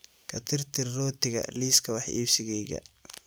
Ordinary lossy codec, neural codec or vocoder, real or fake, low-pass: none; vocoder, 44.1 kHz, 128 mel bands every 256 samples, BigVGAN v2; fake; none